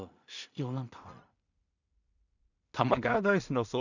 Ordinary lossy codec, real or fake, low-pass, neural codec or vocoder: none; fake; 7.2 kHz; codec, 16 kHz in and 24 kHz out, 0.4 kbps, LongCat-Audio-Codec, two codebook decoder